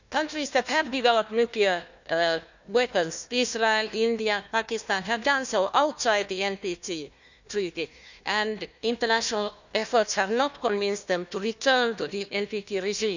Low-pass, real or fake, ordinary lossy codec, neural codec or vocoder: 7.2 kHz; fake; none; codec, 16 kHz, 1 kbps, FunCodec, trained on Chinese and English, 50 frames a second